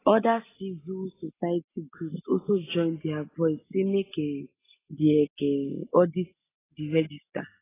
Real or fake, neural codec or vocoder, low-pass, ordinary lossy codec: real; none; 3.6 kHz; AAC, 16 kbps